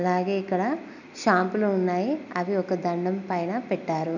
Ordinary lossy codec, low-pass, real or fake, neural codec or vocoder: none; 7.2 kHz; real; none